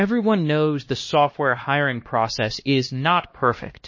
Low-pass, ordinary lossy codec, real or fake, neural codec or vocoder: 7.2 kHz; MP3, 32 kbps; fake; codec, 16 kHz, 1 kbps, X-Codec, HuBERT features, trained on LibriSpeech